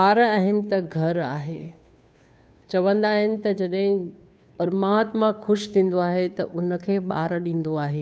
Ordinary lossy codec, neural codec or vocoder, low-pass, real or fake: none; codec, 16 kHz, 2 kbps, FunCodec, trained on Chinese and English, 25 frames a second; none; fake